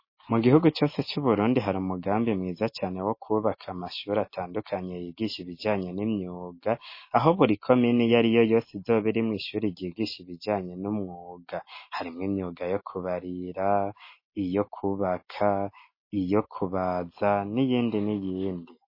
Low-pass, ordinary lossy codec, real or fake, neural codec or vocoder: 5.4 kHz; MP3, 24 kbps; real; none